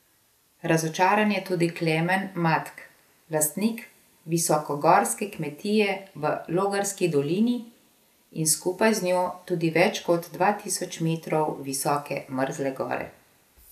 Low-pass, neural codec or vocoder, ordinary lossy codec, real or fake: 14.4 kHz; none; none; real